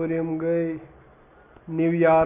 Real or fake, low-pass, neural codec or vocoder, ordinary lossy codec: real; 3.6 kHz; none; none